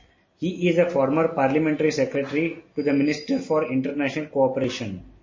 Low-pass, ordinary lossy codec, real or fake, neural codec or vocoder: 7.2 kHz; MP3, 32 kbps; real; none